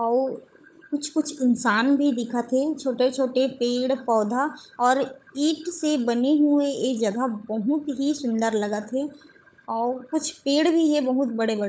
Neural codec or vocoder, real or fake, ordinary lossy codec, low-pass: codec, 16 kHz, 16 kbps, FunCodec, trained on LibriTTS, 50 frames a second; fake; none; none